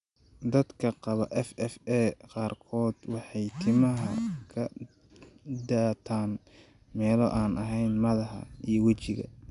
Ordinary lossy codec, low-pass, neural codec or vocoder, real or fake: none; 10.8 kHz; none; real